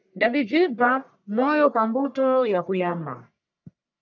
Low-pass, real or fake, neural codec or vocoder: 7.2 kHz; fake; codec, 44.1 kHz, 1.7 kbps, Pupu-Codec